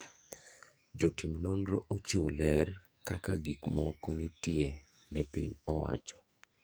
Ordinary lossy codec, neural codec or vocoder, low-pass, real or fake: none; codec, 44.1 kHz, 2.6 kbps, SNAC; none; fake